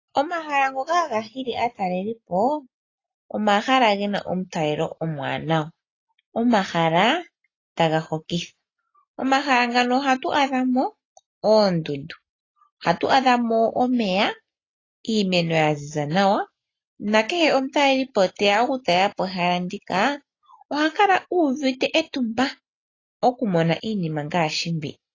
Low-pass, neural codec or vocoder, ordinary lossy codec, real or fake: 7.2 kHz; none; AAC, 32 kbps; real